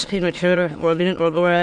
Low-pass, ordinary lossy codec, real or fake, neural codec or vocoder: 9.9 kHz; MP3, 64 kbps; fake; autoencoder, 22.05 kHz, a latent of 192 numbers a frame, VITS, trained on many speakers